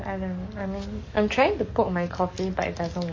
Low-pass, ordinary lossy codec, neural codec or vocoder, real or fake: 7.2 kHz; MP3, 32 kbps; codec, 44.1 kHz, 7.8 kbps, DAC; fake